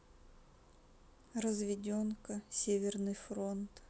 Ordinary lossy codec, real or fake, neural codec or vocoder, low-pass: none; real; none; none